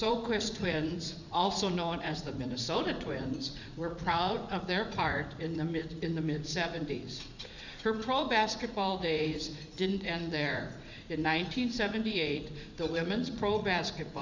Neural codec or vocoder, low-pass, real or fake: vocoder, 44.1 kHz, 80 mel bands, Vocos; 7.2 kHz; fake